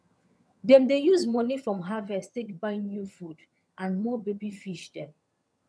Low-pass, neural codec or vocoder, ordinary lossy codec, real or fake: none; vocoder, 22.05 kHz, 80 mel bands, HiFi-GAN; none; fake